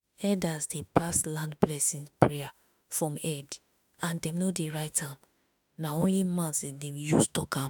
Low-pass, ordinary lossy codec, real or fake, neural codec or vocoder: none; none; fake; autoencoder, 48 kHz, 32 numbers a frame, DAC-VAE, trained on Japanese speech